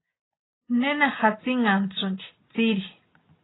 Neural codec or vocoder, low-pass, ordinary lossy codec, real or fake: none; 7.2 kHz; AAC, 16 kbps; real